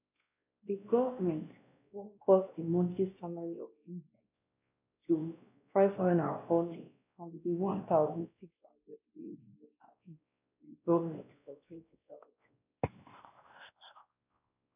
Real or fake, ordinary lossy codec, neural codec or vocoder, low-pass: fake; none; codec, 16 kHz, 1 kbps, X-Codec, WavLM features, trained on Multilingual LibriSpeech; 3.6 kHz